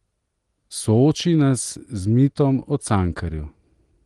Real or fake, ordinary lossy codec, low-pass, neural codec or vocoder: real; Opus, 24 kbps; 10.8 kHz; none